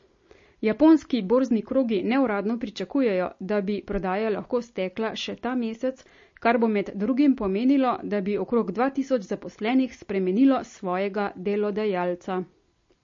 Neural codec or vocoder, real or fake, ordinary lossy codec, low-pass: none; real; MP3, 32 kbps; 7.2 kHz